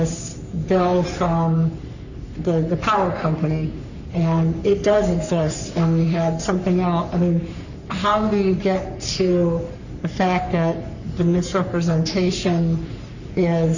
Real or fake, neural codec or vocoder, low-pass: fake; codec, 44.1 kHz, 3.4 kbps, Pupu-Codec; 7.2 kHz